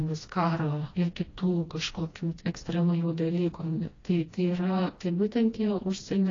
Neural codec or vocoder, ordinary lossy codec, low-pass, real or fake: codec, 16 kHz, 1 kbps, FreqCodec, smaller model; AAC, 32 kbps; 7.2 kHz; fake